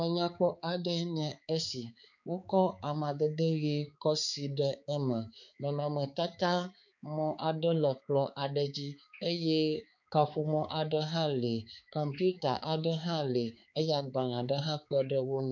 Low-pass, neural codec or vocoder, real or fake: 7.2 kHz; codec, 16 kHz, 4 kbps, X-Codec, HuBERT features, trained on balanced general audio; fake